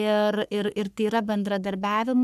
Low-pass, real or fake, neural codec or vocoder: 14.4 kHz; fake; codec, 44.1 kHz, 3.4 kbps, Pupu-Codec